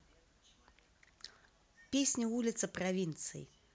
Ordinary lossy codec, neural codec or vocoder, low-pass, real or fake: none; none; none; real